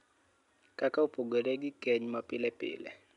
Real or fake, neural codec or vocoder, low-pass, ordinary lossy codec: real; none; 10.8 kHz; none